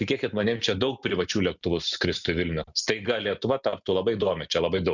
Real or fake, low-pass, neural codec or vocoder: real; 7.2 kHz; none